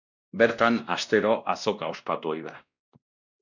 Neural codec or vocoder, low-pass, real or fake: codec, 16 kHz, 1 kbps, X-Codec, WavLM features, trained on Multilingual LibriSpeech; 7.2 kHz; fake